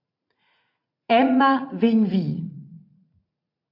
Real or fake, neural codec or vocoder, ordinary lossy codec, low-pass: fake; vocoder, 44.1 kHz, 80 mel bands, Vocos; AAC, 32 kbps; 5.4 kHz